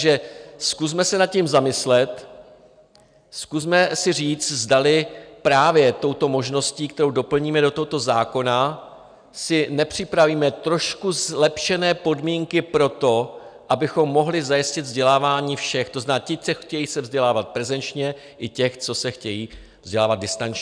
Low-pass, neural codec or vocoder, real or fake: 9.9 kHz; none; real